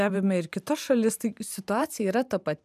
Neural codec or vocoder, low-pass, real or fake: vocoder, 44.1 kHz, 128 mel bands every 512 samples, BigVGAN v2; 14.4 kHz; fake